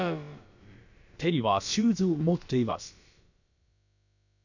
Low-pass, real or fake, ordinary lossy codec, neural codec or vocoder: 7.2 kHz; fake; none; codec, 16 kHz, about 1 kbps, DyCAST, with the encoder's durations